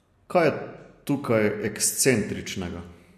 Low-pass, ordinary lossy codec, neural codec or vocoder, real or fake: 14.4 kHz; MP3, 64 kbps; none; real